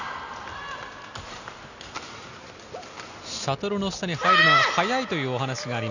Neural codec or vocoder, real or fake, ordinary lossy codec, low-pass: none; real; none; 7.2 kHz